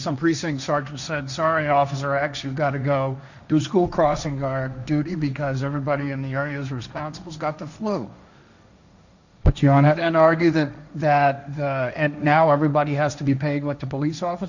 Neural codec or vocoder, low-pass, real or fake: codec, 16 kHz, 1.1 kbps, Voila-Tokenizer; 7.2 kHz; fake